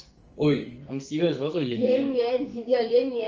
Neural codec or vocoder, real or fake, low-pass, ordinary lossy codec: codec, 44.1 kHz, 2.6 kbps, SNAC; fake; 7.2 kHz; Opus, 24 kbps